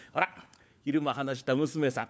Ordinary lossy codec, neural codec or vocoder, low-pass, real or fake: none; codec, 16 kHz, 4 kbps, FunCodec, trained on LibriTTS, 50 frames a second; none; fake